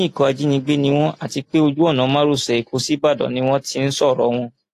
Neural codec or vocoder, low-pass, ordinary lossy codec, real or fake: none; 14.4 kHz; AAC, 48 kbps; real